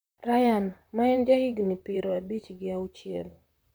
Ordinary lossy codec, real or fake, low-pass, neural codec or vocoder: none; fake; none; vocoder, 44.1 kHz, 128 mel bands, Pupu-Vocoder